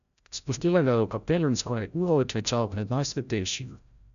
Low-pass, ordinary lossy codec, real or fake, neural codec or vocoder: 7.2 kHz; none; fake; codec, 16 kHz, 0.5 kbps, FreqCodec, larger model